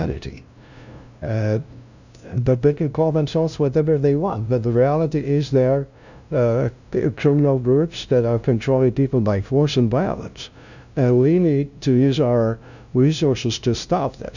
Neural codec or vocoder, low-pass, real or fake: codec, 16 kHz, 0.5 kbps, FunCodec, trained on LibriTTS, 25 frames a second; 7.2 kHz; fake